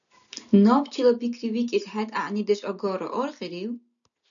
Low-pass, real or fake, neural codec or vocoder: 7.2 kHz; real; none